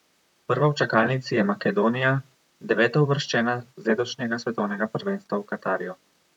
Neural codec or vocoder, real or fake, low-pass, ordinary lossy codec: vocoder, 44.1 kHz, 128 mel bands, Pupu-Vocoder; fake; 19.8 kHz; none